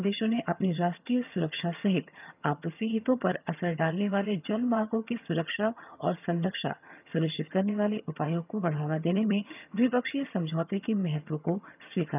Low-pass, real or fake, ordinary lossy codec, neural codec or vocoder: 3.6 kHz; fake; none; vocoder, 22.05 kHz, 80 mel bands, HiFi-GAN